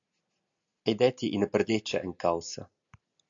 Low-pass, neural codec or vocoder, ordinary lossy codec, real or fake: 7.2 kHz; none; AAC, 64 kbps; real